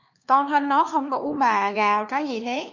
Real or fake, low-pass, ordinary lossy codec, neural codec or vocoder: fake; 7.2 kHz; MP3, 48 kbps; codec, 16 kHz, 2 kbps, X-Codec, HuBERT features, trained on LibriSpeech